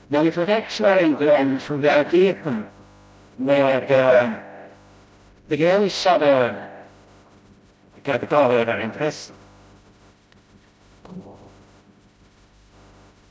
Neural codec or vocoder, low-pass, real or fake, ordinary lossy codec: codec, 16 kHz, 0.5 kbps, FreqCodec, smaller model; none; fake; none